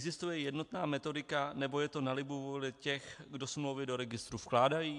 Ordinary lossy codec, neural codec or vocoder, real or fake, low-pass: AAC, 64 kbps; none; real; 10.8 kHz